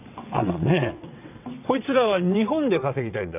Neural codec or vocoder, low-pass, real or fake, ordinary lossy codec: vocoder, 22.05 kHz, 80 mel bands, WaveNeXt; 3.6 kHz; fake; none